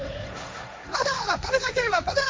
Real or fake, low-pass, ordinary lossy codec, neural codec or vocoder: fake; none; none; codec, 16 kHz, 1.1 kbps, Voila-Tokenizer